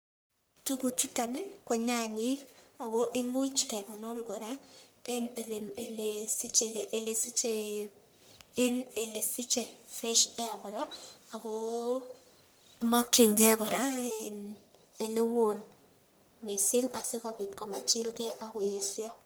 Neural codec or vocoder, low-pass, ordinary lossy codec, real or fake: codec, 44.1 kHz, 1.7 kbps, Pupu-Codec; none; none; fake